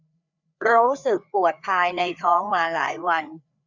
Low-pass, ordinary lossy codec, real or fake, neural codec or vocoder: 7.2 kHz; none; fake; codec, 16 kHz, 4 kbps, FreqCodec, larger model